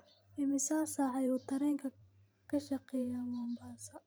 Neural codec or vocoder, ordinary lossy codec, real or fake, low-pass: vocoder, 44.1 kHz, 128 mel bands every 512 samples, BigVGAN v2; none; fake; none